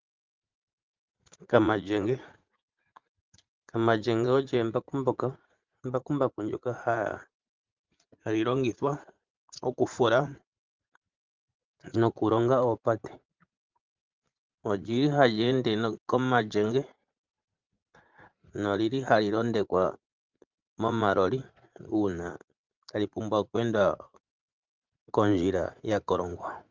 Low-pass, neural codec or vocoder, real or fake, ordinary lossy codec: 7.2 kHz; vocoder, 44.1 kHz, 80 mel bands, Vocos; fake; Opus, 32 kbps